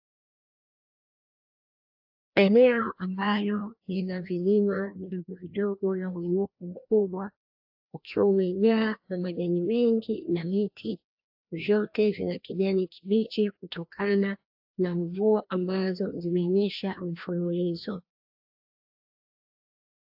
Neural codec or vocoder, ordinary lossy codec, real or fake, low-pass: codec, 16 kHz, 1 kbps, FreqCodec, larger model; AAC, 48 kbps; fake; 5.4 kHz